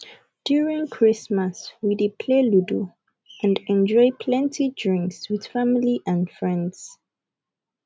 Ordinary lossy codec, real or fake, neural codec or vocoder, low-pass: none; real; none; none